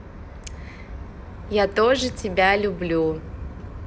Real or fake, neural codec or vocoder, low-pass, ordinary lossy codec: real; none; none; none